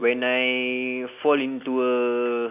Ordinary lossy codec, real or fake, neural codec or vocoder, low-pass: none; real; none; 3.6 kHz